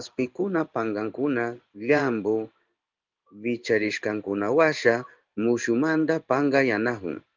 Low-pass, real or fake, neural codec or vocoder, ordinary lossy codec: 7.2 kHz; fake; codec, 16 kHz in and 24 kHz out, 1 kbps, XY-Tokenizer; Opus, 24 kbps